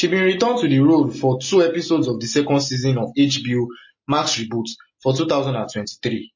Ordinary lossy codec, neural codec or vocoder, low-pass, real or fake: MP3, 32 kbps; none; 7.2 kHz; real